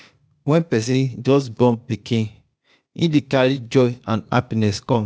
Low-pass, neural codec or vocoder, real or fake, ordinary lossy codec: none; codec, 16 kHz, 0.8 kbps, ZipCodec; fake; none